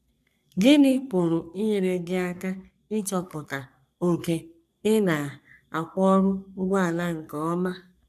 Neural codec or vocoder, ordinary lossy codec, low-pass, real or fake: codec, 44.1 kHz, 3.4 kbps, Pupu-Codec; none; 14.4 kHz; fake